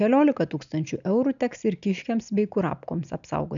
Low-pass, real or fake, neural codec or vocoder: 7.2 kHz; real; none